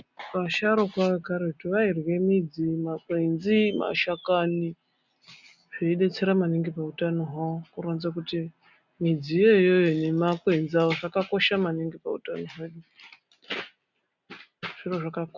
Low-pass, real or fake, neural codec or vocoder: 7.2 kHz; real; none